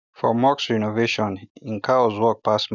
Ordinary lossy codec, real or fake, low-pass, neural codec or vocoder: none; real; 7.2 kHz; none